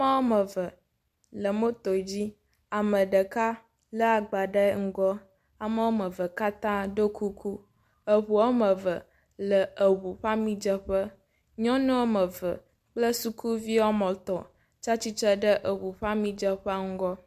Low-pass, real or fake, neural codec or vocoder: 14.4 kHz; real; none